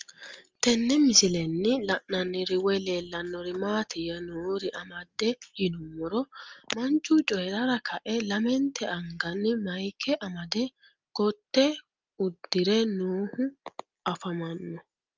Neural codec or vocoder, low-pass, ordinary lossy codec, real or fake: none; 7.2 kHz; Opus, 24 kbps; real